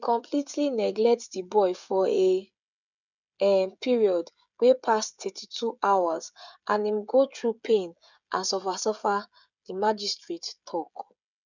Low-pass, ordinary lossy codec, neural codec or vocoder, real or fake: 7.2 kHz; none; codec, 16 kHz, 16 kbps, FreqCodec, smaller model; fake